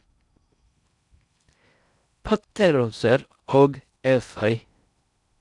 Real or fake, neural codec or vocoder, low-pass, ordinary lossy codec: fake; codec, 16 kHz in and 24 kHz out, 0.6 kbps, FocalCodec, streaming, 4096 codes; 10.8 kHz; none